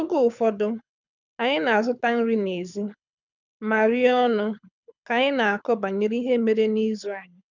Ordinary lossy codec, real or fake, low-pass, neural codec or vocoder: none; fake; 7.2 kHz; codec, 24 kHz, 6 kbps, HILCodec